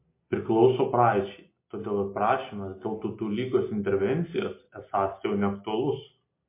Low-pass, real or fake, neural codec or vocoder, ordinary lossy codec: 3.6 kHz; real; none; MP3, 24 kbps